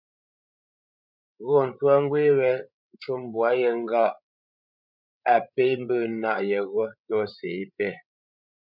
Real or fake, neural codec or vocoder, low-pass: fake; codec, 16 kHz, 16 kbps, FreqCodec, larger model; 5.4 kHz